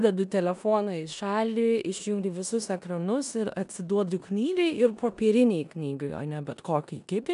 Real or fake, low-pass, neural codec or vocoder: fake; 10.8 kHz; codec, 16 kHz in and 24 kHz out, 0.9 kbps, LongCat-Audio-Codec, four codebook decoder